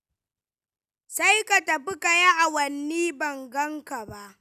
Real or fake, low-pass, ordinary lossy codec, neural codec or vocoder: real; 14.4 kHz; none; none